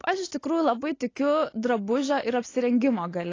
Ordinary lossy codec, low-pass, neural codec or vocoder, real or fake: AAC, 32 kbps; 7.2 kHz; none; real